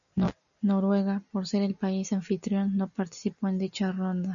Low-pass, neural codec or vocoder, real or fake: 7.2 kHz; none; real